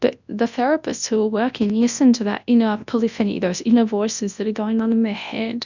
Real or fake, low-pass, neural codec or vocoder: fake; 7.2 kHz; codec, 24 kHz, 0.9 kbps, WavTokenizer, large speech release